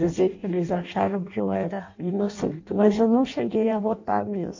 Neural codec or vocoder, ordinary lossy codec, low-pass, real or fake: codec, 16 kHz in and 24 kHz out, 0.6 kbps, FireRedTTS-2 codec; AAC, 48 kbps; 7.2 kHz; fake